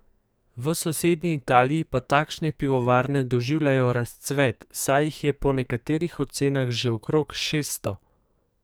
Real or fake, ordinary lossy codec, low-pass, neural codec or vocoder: fake; none; none; codec, 44.1 kHz, 2.6 kbps, SNAC